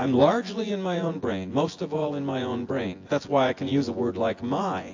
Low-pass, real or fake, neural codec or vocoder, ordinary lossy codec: 7.2 kHz; fake; vocoder, 24 kHz, 100 mel bands, Vocos; AAC, 48 kbps